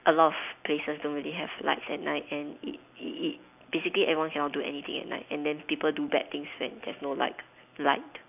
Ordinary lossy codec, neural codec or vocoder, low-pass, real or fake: none; none; 3.6 kHz; real